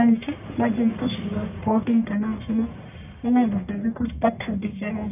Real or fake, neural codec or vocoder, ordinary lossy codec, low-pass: fake; codec, 44.1 kHz, 1.7 kbps, Pupu-Codec; none; 3.6 kHz